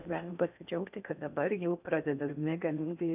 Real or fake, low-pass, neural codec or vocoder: fake; 3.6 kHz; codec, 16 kHz in and 24 kHz out, 0.6 kbps, FocalCodec, streaming, 4096 codes